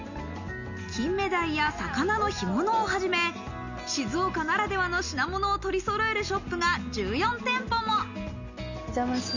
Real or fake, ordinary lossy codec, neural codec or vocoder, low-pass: real; none; none; 7.2 kHz